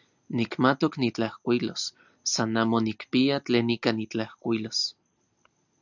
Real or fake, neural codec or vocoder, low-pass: real; none; 7.2 kHz